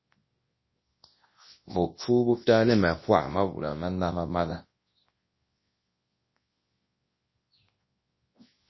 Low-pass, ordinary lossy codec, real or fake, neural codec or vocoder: 7.2 kHz; MP3, 24 kbps; fake; codec, 24 kHz, 0.9 kbps, WavTokenizer, large speech release